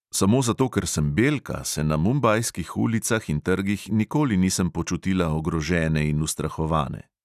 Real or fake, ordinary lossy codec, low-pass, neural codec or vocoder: real; none; 14.4 kHz; none